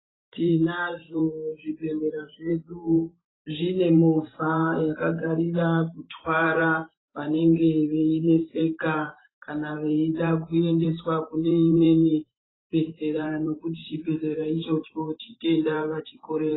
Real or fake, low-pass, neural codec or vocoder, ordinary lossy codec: fake; 7.2 kHz; vocoder, 44.1 kHz, 128 mel bands every 512 samples, BigVGAN v2; AAC, 16 kbps